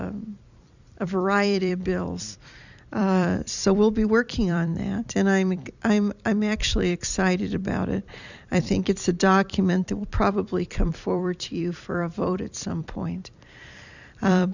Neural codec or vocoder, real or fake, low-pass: vocoder, 44.1 kHz, 128 mel bands every 256 samples, BigVGAN v2; fake; 7.2 kHz